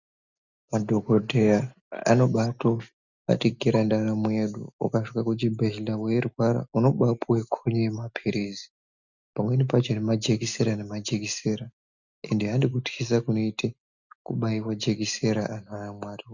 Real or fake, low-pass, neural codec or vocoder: real; 7.2 kHz; none